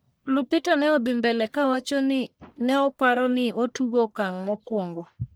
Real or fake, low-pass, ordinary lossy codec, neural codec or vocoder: fake; none; none; codec, 44.1 kHz, 1.7 kbps, Pupu-Codec